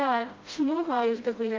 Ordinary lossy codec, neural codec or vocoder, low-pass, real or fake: Opus, 24 kbps; codec, 16 kHz, 0.5 kbps, FreqCodec, smaller model; 7.2 kHz; fake